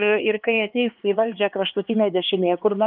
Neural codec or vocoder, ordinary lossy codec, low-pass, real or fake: codec, 16 kHz, 4 kbps, X-Codec, HuBERT features, trained on LibriSpeech; Opus, 32 kbps; 5.4 kHz; fake